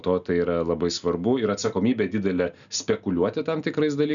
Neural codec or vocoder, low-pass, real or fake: none; 7.2 kHz; real